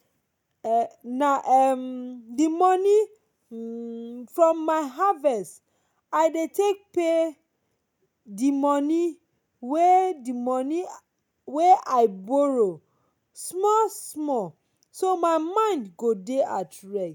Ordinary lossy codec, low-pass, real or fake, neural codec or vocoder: none; 19.8 kHz; real; none